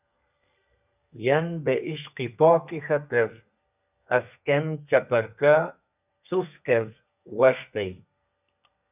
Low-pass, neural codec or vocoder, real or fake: 3.6 kHz; codec, 32 kHz, 1.9 kbps, SNAC; fake